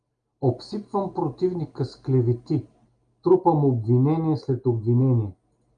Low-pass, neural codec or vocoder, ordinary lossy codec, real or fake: 9.9 kHz; none; Opus, 32 kbps; real